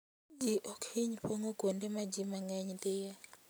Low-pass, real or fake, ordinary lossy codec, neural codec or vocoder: none; real; none; none